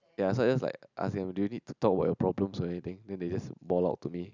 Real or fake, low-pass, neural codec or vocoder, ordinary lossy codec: real; 7.2 kHz; none; none